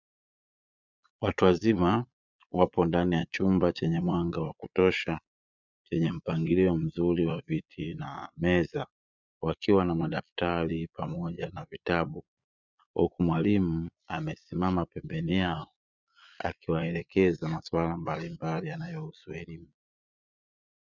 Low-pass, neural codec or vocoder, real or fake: 7.2 kHz; vocoder, 22.05 kHz, 80 mel bands, Vocos; fake